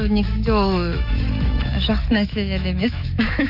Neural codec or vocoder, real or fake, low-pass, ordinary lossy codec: autoencoder, 48 kHz, 128 numbers a frame, DAC-VAE, trained on Japanese speech; fake; 5.4 kHz; none